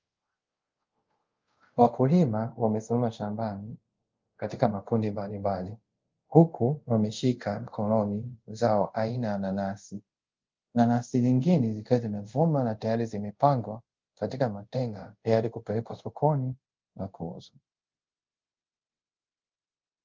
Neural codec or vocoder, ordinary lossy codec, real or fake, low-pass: codec, 24 kHz, 0.5 kbps, DualCodec; Opus, 24 kbps; fake; 7.2 kHz